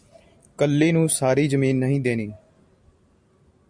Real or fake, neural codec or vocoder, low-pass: real; none; 9.9 kHz